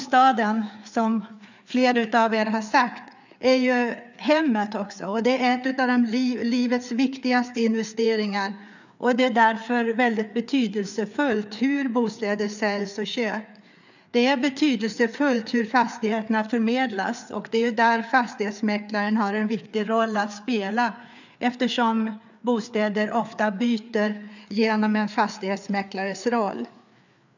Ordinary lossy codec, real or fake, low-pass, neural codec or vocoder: none; fake; 7.2 kHz; codec, 16 kHz, 4 kbps, FreqCodec, larger model